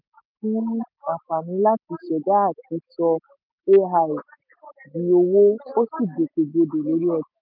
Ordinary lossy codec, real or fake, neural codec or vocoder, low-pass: none; real; none; 5.4 kHz